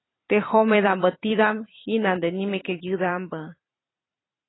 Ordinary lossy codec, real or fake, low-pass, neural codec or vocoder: AAC, 16 kbps; fake; 7.2 kHz; vocoder, 44.1 kHz, 128 mel bands every 256 samples, BigVGAN v2